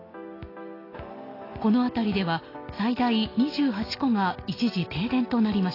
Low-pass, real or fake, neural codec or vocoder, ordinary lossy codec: 5.4 kHz; real; none; AAC, 24 kbps